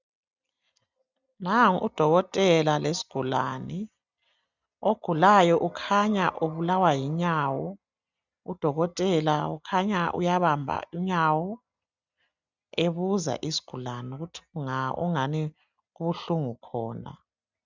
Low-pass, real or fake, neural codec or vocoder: 7.2 kHz; real; none